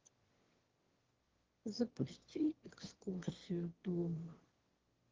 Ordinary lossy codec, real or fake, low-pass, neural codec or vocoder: Opus, 16 kbps; fake; 7.2 kHz; autoencoder, 22.05 kHz, a latent of 192 numbers a frame, VITS, trained on one speaker